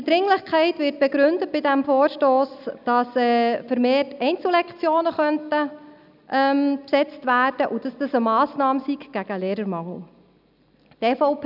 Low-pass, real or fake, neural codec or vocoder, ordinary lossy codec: 5.4 kHz; real; none; none